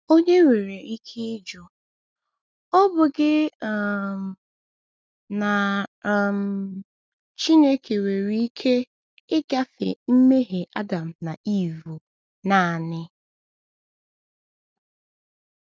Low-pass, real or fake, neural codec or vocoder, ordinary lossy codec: none; real; none; none